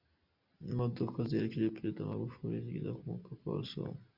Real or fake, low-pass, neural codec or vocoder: real; 5.4 kHz; none